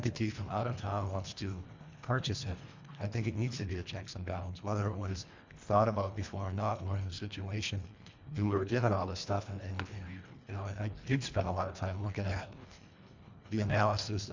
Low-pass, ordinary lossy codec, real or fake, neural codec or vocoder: 7.2 kHz; MP3, 64 kbps; fake; codec, 24 kHz, 1.5 kbps, HILCodec